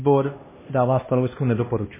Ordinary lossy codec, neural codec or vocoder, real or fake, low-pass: MP3, 16 kbps; codec, 16 kHz, 1 kbps, X-Codec, HuBERT features, trained on LibriSpeech; fake; 3.6 kHz